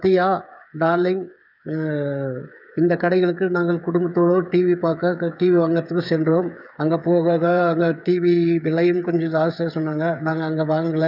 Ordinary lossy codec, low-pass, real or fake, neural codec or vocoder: none; 5.4 kHz; fake; codec, 16 kHz, 8 kbps, FreqCodec, smaller model